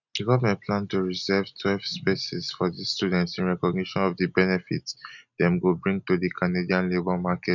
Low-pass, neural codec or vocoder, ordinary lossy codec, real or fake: 7.2 kHz; none; none; real